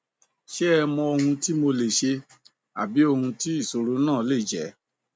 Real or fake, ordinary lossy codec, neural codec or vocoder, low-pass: real; none; none; none